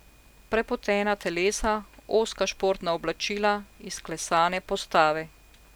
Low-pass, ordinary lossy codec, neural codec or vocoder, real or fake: none; none; none; real